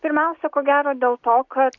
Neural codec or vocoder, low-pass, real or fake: none; 7.2 kHz; real